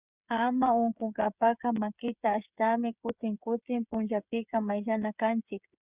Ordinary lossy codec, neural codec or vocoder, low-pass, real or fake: Opus, 64 kbps; codec, 16 kHz, 8 kbps, FreqCodec, smaller model; 3.6 kHz; fake